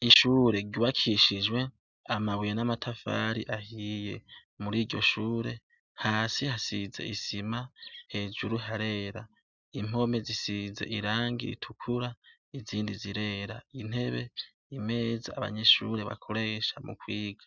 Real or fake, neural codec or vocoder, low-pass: real; none; 7.2 kHz